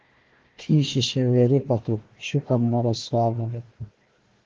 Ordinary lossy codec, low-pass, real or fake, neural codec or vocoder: Opus, 16 kbps; 7.2 kHz; fake; codec, 16 kHz, 1 kbps, FunCodec, trained on Chinese and English, 50 frames a second